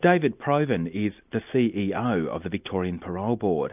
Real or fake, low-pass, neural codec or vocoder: real; 3.6 kHz; none